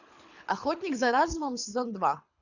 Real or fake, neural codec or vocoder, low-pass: fake; codec, 24 kHz, 6 kbps, HILCodec; 7.2 kHz